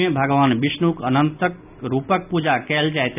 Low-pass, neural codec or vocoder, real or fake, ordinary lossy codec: 3.6 kHz; none; real; none